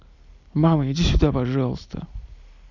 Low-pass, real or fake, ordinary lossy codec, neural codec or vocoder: 7.2 kHz; real; none; none